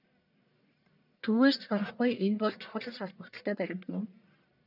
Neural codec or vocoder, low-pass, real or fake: codec, 44.1 kHz, 1.7 kbps, Pupu-Codec; 5.4 kHz; fake